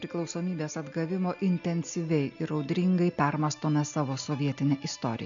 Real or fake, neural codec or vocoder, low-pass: real; none; 7.2 kHz